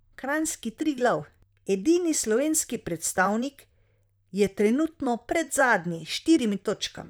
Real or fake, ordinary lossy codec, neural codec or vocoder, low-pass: fake; none; vocoder, 44.1 kHz, 128 mel bands, Pupu-Vocoder; none